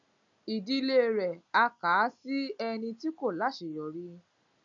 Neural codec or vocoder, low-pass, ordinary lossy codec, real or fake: none; 7.2 kHz; none; real